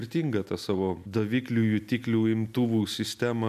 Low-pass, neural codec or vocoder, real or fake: 14.4 kHz; none; real